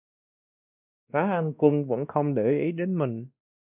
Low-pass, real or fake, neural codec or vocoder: 3.6 kHz; fake; codec, 16 kHz, 1 kbps, X-Codec, WavLM features, trained on Multilingual LibriSpeech